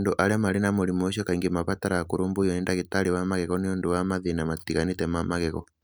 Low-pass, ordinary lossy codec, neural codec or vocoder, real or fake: none; none; none; real